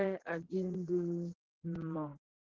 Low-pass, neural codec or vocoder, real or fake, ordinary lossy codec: 7.2 kHz; vocoder, 44.1 kHz, 80 mel bands, Vocos; fake; Opus, 16 kbps